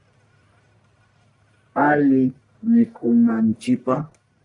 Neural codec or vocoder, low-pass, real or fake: codec, 44.1 kHz, 1.7 kbps, Pupu-Codec; 10.8 kHz; fake